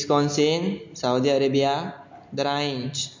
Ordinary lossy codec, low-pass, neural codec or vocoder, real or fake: MP3, 48 kbps; 7.2 kHz; none; real